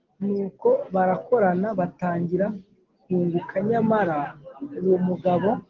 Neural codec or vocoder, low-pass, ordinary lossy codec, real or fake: none; 7.2 kHz; Opus, 16 kbps; real